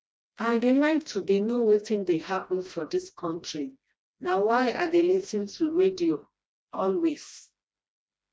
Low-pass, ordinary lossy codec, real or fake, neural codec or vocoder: none; none; fake; codec, 16 kHz, 1 kbps, FreqCodec, smaller model